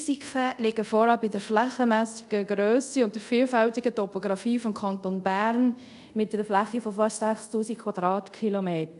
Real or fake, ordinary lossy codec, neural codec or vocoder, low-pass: fake; none; codec, 24 kHz, 0.5 kbps, DualCodec; 10.8 kHz